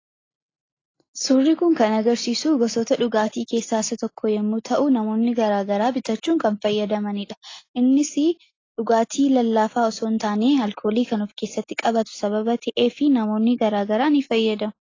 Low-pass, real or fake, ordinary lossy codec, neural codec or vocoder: 7.2 kHz; real; AAC, 32 kbps; none